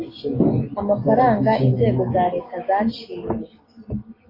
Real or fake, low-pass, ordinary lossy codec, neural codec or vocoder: real; 5.4 kHz; AAC, 24 kbps; none